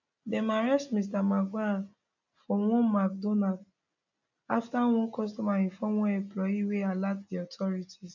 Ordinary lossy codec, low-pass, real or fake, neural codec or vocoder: none; 7.2 kHz; real; none